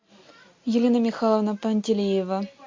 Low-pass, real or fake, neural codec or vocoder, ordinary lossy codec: 7.2 kHz; real; none; MP3, 48 kbps